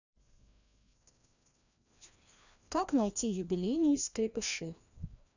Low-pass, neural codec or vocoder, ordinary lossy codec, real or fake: 7.2 kHz; codec, 16 kHz, 1 kbps, FreqCodec, larger model; none; fake